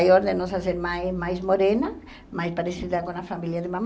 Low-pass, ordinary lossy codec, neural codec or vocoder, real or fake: none; none; none; real